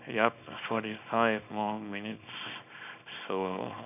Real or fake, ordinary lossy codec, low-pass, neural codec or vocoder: fake; none; 3.6 kHz; codec, 24 kHz, 0.9 kbps, WavTokenizer, small release